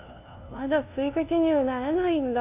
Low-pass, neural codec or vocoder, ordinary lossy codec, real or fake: 3.6 kHz; codec, 16 kHz, 0.5 kbps, FunCodec, trained on LibriTTS, 25 frames a second; MP3, 32 kbps; fake